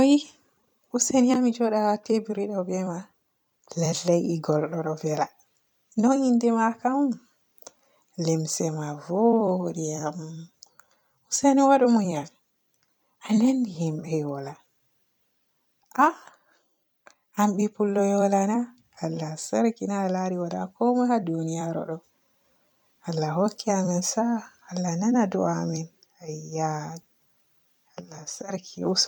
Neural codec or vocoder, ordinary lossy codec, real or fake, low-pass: vocoder, 44.1 kHz, 128 mel bands every 256 samples, BigVGAN v2; none; fake; 19.8 kHz